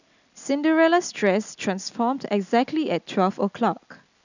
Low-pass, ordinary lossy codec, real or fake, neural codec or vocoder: 7.2 kHz; none; real; none